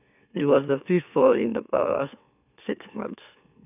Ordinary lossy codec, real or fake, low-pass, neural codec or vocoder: none; fake; 3.6 kHz; autoencoder, 44.1 kHz, a latent of 192 numbers a frame, MeloTTS